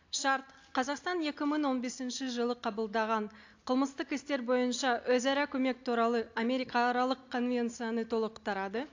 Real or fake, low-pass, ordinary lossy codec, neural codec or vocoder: real; 7.2 kHz; AAC, 48 kbps; none